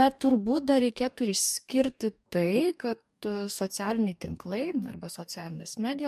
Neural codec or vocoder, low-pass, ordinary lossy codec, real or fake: codec, 44.1 kHz, 2.6 kbps, DAC; 14.4 kHz; MP3, 96 kbps; fake